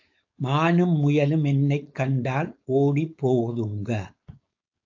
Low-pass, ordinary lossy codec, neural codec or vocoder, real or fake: 7.2 kHz; AAC, 48 kbps; codec, 16 kHz, 4.8 kbps, FACodec; fake